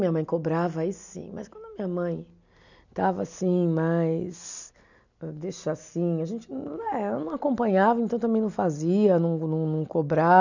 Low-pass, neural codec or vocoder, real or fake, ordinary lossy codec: 7.2 kHz; none; real; none